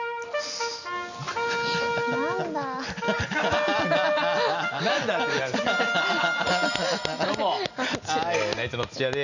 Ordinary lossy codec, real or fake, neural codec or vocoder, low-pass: none; real; none; 7.2 kHz